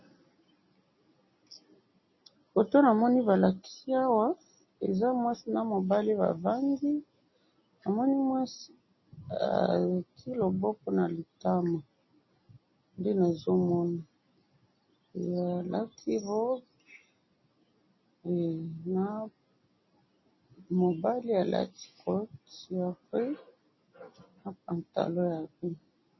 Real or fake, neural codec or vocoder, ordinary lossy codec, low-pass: real; none; MP3, 24 kbps; 7.2 kHz